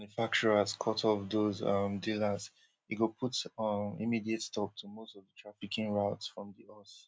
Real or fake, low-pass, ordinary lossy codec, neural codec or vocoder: real; none; none; none